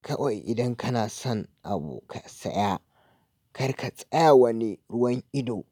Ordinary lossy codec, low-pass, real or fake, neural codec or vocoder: none; 19.8 kHz; real; none